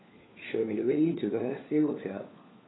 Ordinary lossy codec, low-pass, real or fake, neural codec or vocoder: AAC, 16 kbps; 7.2 kHz; fake; codec, 16 kHz, 4 kbps, FunCodec, trained on LibriTTS, 50 frames a second